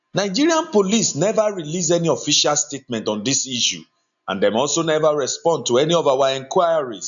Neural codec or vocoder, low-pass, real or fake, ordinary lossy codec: none; 7.2 kHz; real; none